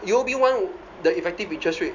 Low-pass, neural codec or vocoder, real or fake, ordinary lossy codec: 7.2 kHz; none; real; none